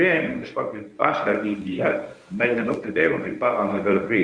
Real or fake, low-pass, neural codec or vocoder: fake; 9.9 kHz; codec, 24 kHz, 0.9 kbps, WavTokenizer, medium speech release version 1